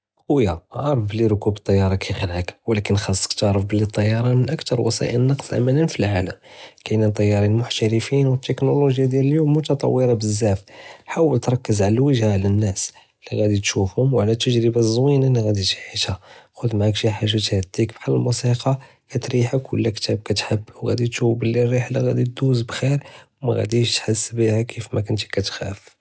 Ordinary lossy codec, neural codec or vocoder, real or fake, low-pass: none; none; real; none